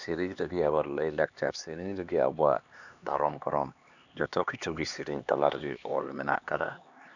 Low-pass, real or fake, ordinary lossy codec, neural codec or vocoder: 7.2 kHz; fake; none; codec, 16 kHz, 2 kbps, X-Codec, HuBERT features, trained on LibriSpeech